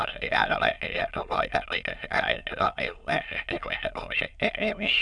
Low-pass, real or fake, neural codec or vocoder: 9.9 kHz; fake; autoencoder, 22.05 kHz, a latent of 192 numbers a frame, VITS, trained on many speakers